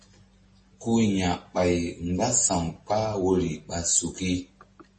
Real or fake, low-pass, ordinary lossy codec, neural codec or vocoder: real; 10.8 kHz; MP3, 32 kbps; none